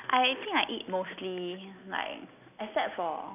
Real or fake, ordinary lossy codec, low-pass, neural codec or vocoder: real; none; 3.6 kHz; none